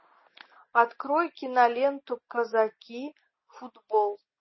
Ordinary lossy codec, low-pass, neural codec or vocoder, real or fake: MP3, 24 kbps; 7.2 kHz; none; real